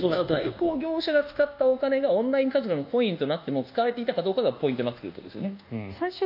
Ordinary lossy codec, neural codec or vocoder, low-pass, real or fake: none; codec, 24 kHz, 1.2 kbps, DualCodec; 5.4 kHz; fake